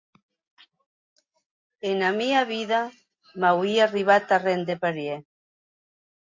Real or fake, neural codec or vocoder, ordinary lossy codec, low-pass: real; none; MP3, 48 kbps; 7.2 kHz